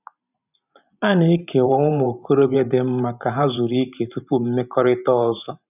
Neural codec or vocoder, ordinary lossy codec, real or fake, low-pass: vocoder, 44.1 kHz, 128 mel bands every 512 samples, BigVGAN v2; none; fake; 3.6 kHz